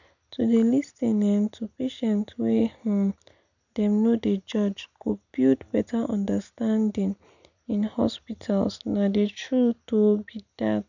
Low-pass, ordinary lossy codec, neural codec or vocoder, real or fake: 7.2 kHz; none; none; real